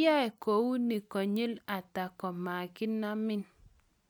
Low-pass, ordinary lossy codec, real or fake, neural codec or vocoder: none; none; real; none